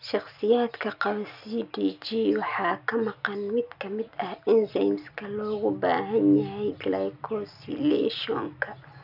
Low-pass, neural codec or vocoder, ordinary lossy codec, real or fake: 5.4 kHz; none; none; real